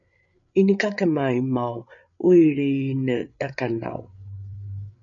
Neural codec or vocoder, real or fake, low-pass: codec, 16 kHz, 8 kbps, FreqCodec, larger model; fake; 7.2 kHz